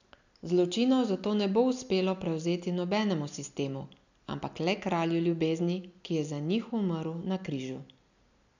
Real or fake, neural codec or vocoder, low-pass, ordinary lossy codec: real; none; 7.2 kHz; none